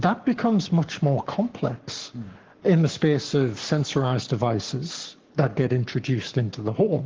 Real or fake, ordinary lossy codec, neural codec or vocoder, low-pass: fake; Opus, 16 kbps; codec, 44.1 kHz, 7.8 kbps, Pupu-Codec; 7.2 kHz